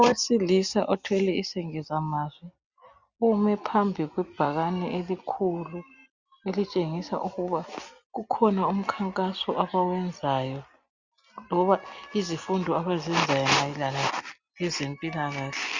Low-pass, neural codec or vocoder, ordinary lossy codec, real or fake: 7.2 kHz; none; Opus, 64 kbps; real